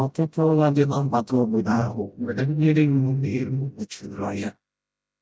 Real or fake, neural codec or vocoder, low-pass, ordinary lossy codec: fake; codec, 16 kHz, 0.5 kbps, FreqCodec, smaller model; none; none